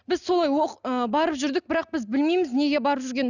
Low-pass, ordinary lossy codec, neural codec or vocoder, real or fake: 7.2 kHz; none; none; real